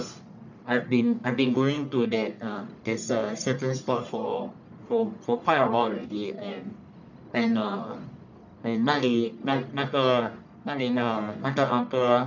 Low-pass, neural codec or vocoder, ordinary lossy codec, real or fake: 7.2 kHz; codec, 44.1 kHz, 1.7 kbps, Pupu-Codec; none; fake